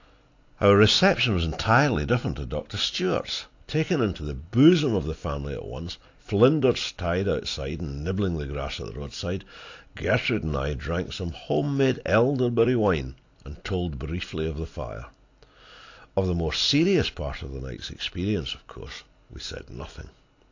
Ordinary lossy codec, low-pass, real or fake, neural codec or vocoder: AAC, 48 kbps; 7.2 kHz; real; none